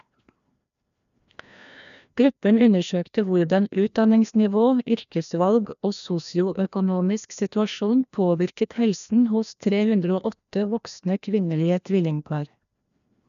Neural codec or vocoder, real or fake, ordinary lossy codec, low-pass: codec, 16 kHz, 1 kbps, FreqCodec, larger model; fake; none; 7.2 kHz